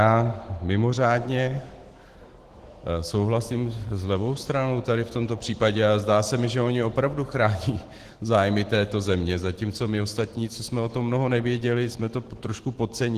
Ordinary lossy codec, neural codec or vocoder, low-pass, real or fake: Opus, 16 kbps; autoencoder, 48 kHz, 128 numbers a frame, DAC-VAE, trained on Japanese speech; 14.4 kHz; fake